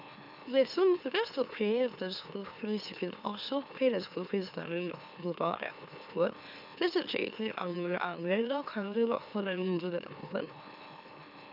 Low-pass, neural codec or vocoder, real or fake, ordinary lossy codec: 5.4 kHz; autoencoder, 44.1 kHz, a latent of 192 numbers a frame, MeloTTS; fake; none